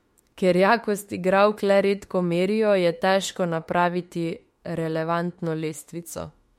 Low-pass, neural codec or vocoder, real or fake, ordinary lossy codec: 19.8 kHz; autoencoder, 48 kHz, 32 numbers a frame, DAC-VAE, trained on Japanese speech; fake; MP3, 64 kbps